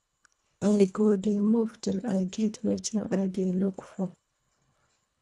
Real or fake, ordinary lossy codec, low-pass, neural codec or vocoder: fake; none; none; codec, 24 kHz, 1.5 kbps, HILCodec